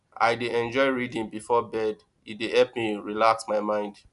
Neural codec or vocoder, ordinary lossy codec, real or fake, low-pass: none; none; real; 10.8 kHz